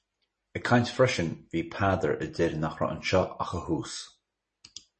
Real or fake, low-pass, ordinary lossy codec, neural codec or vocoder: real; 10.8 kHz; MP3, 32 kbps; none